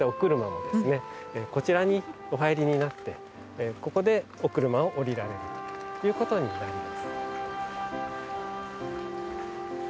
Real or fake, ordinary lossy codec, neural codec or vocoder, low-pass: real; none; none; none